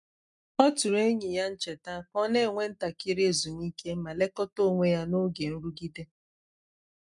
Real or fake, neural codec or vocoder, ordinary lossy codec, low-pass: real; none; none; 10.8 kHz